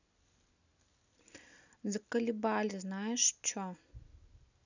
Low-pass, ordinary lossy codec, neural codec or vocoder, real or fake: 7.2 kHz; none; none; real